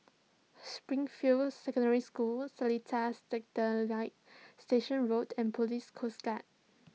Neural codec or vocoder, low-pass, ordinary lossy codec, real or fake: none; none; none; real